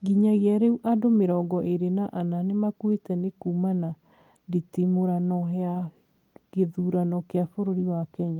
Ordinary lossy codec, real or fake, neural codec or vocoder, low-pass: Opus, 32 kbps; real; none; 19.8 kHz